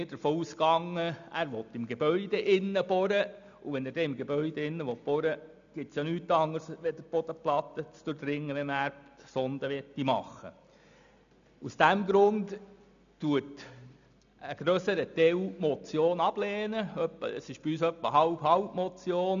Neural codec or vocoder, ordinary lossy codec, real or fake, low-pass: none; none; real; 7.2 kHz